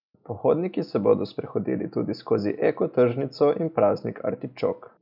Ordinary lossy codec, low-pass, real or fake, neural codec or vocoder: none; 5.4 kHz; real; none